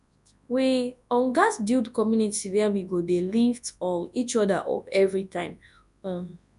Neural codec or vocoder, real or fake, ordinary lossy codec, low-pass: codec, 24 kHz, 0.9 kbps, WavTokenizer, large speech release; fake; none; 10.8 kHz